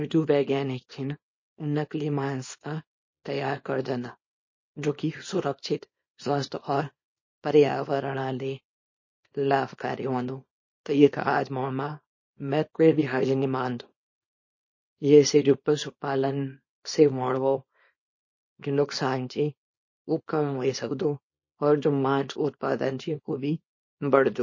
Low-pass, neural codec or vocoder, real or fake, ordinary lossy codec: 7.2 kHz; codec, 24 kHz, 0.9 kbps, WavTokenizer, small release; fake; MP3, 32 kbps